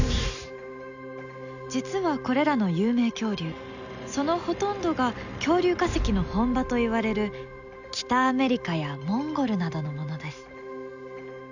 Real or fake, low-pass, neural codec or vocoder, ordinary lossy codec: real; 7.2 kHz; none; none